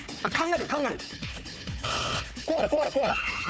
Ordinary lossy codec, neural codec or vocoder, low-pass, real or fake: none; codec, 16 kHz, 4 kbps, FunCodec, trained on Chinese and English, 50 frames a second; none; fake